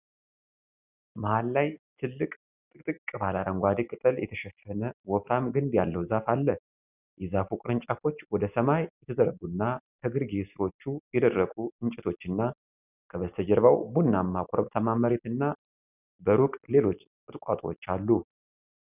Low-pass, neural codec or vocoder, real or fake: 3.6 kHz; none; real